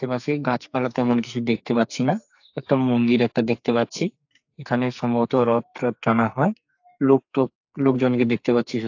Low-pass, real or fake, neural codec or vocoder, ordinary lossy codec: 7.2 kHz; fake; codec, 44.1 kHz, 2.6 kbps, SNAC; AAC, 48 kbps